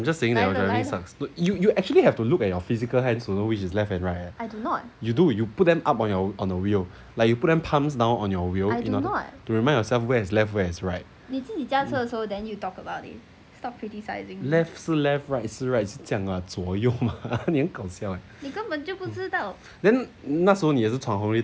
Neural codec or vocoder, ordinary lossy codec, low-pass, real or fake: none; none; none; real